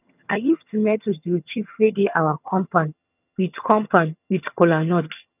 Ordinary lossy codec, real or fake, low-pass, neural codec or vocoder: none; fake; 3.6 kHz; vocoder, 22.05 kHz, 80 mel bands, HiFi-GAN